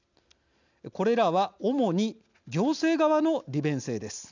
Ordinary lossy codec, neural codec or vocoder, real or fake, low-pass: none; none; real; 7.2 kHz